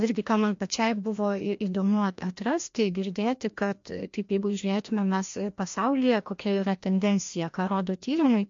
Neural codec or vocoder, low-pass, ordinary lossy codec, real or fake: codec, 16 kHz, 1 kbps, FreqCodec, larger model; 7.2 kHz; MP3, 48 kbps; fake